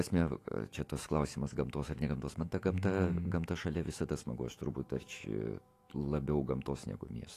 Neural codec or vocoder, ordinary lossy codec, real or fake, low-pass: autoencoder, 48 kHz, 128 numbers a frame, DAC-VAE, trained on Japanese speech; AAC, 48 kbps; fake; 14.4 kHz